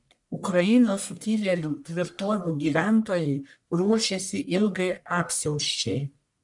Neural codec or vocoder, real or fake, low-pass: codec, 44.1 kHz, 1.7 kbps, Pupu-Codec; fake; 10.8 kHz